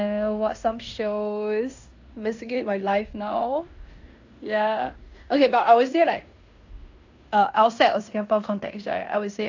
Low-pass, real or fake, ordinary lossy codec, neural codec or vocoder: 7.2 kHz; fake; AAC, 48 kbps; codec, 16 kHz in and 24 kHz out, 0.9 kbps, LongCat-Audio-Codec, fine tuned four codebook decoder